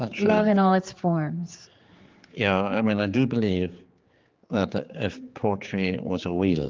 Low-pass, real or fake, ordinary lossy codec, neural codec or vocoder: 7.2 kHz; fake; Opus, 32 kbps; codec, 16 kHz, 4 kbps, X-Codec, HuBERT features, trained on general audio